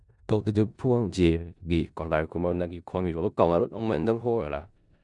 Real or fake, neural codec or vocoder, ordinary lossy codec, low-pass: fake; codec, 16 kHz in and 24 kHz out, 0.4 kbps, LongCat-Audio-Codec, four codebook decoder; none; 10.8 kHz